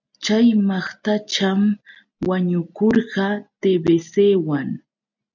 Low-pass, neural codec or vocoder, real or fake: 7.2 kHz; none; real